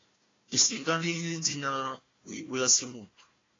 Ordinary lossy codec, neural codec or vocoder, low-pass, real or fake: AAC, 32 kbps; codec, 16 kHz, 1 kbps, FunCodec, trained on Chinese and English, 50 frames a second; 7.2 kHz; fake